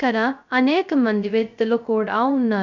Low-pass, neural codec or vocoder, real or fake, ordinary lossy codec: 7.2 kHz; codec, 16 kHz, 0.2 kbps, FocalCodec; fake; none